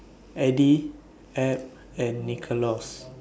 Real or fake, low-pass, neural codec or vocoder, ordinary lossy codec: real; none; none; none